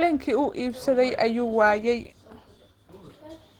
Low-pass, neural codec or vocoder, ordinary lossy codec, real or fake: 19.8 kHz; none; Opus, 16 kbps; real